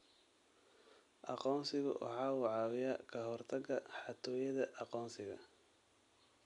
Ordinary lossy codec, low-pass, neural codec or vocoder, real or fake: none; 10.8 kHz; none; real